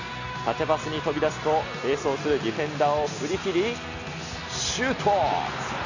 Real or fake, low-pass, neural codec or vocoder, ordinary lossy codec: real; 7.2 kHz; none; none